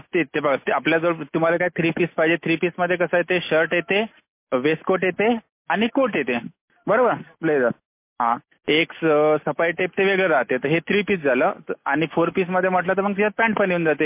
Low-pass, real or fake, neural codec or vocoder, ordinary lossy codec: 3.6 kHz; real; none; MP3, 24 kbps